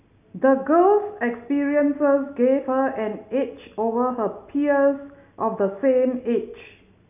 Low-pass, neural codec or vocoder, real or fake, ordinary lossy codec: 3.6 kHz; none; real; none